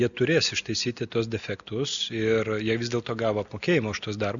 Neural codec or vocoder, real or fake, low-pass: none; real; 7.2 kHz